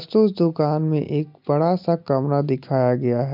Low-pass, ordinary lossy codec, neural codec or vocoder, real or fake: 5.4 kHz; MP3, 48 kbps; none; real